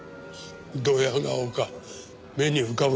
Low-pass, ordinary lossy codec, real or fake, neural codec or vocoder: none; none; real; none